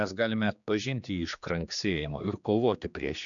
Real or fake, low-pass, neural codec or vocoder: fake; 7.2 kHz; codec, 16 kHz, 2 kbps, X-Codec, HuBERT features, trained on general audio